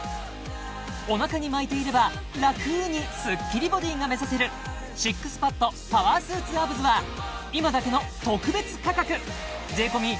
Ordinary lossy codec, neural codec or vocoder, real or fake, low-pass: none; none; real; none